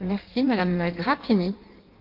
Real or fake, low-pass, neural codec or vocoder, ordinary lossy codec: fake; 5.4 kHz; codec, 16 kHz in and 24 kHz out, 1.1 kbps, FireRedTTS-2 codec; Opus, 32 kbps